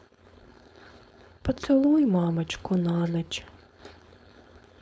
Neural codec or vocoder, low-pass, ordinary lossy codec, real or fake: codec, 16 kHz, 4.8 kbps, FACodec; none; none; fake